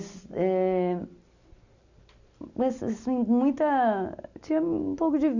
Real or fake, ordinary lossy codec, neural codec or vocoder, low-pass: real; none; none; 7.2 kHz